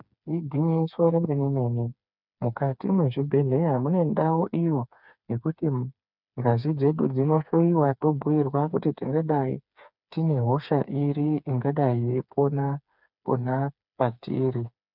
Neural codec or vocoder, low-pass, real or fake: codec, 16 kHz, 4 kbps, FreqCodec, smaller model; 5.4 kHz; fake